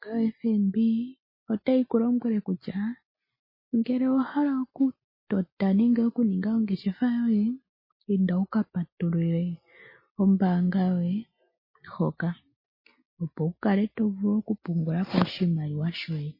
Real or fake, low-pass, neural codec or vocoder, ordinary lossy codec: real; 5.4 kHz; none; MP3, 24 kbps